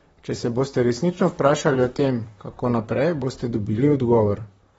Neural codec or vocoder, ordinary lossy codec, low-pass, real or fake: vocoder, 44.1 kHz, 128 mel bands, Pupu-Vocoder; AAC, 24 kbps; 19.8 kHz; fake